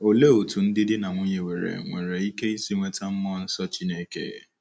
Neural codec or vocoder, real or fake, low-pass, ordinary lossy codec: none; real; none; none